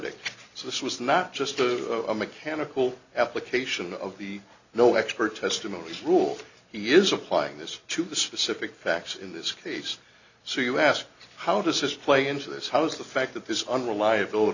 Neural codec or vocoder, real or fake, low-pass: none; real; 7.2 kHz